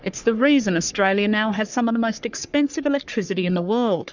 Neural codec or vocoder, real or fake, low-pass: codec, 44.1 kHz, 3.4 kbps, Pupu-Codec; fake; 7.2 kHz